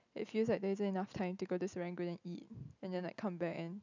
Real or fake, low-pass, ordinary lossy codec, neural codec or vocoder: real; 7.2 kHz; none; none